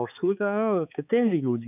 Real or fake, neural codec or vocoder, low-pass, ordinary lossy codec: fake; codec, 16 kHz, 4 kbps, X-Codec, HuBERT features, trained on balanced general audio; 3.6 kHz; none